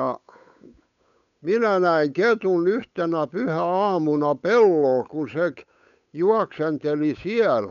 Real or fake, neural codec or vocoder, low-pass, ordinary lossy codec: fake; codec, 16 kHz, 8 kbps, FunCodec, trained on Chinese and English, 25 frames a second; 7.2 kHz; none